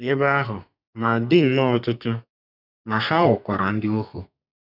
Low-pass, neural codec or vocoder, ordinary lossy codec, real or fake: 5.4 kHz; codec, 32 kHz, 1.9 kbps, SNAC; none; fake